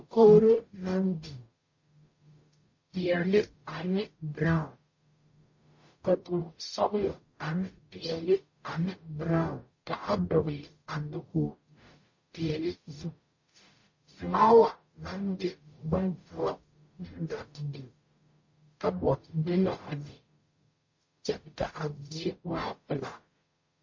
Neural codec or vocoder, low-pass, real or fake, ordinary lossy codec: codec, 44.1 kHz, 0.9 kbps, DAC; 7.2 kHz; fake; MP3, 32 kbps